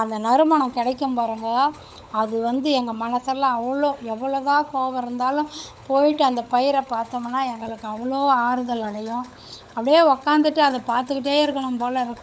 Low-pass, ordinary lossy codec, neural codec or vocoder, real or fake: none; none; codec, 16 kHz, 16 kbps, FunCodec, trained on LibriTTS, 50 frames a second; fake